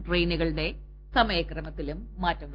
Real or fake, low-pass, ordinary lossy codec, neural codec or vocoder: real; 5.4 kHz; Opus, 32 kbps; none